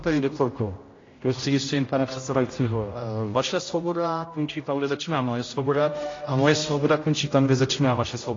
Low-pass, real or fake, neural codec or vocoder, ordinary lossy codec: 7.2 kHz; fake; codec, 16 kHz, 0.5 kbps, X-Codec, HuBERT features, trained on general audio; AAC, 32 kbps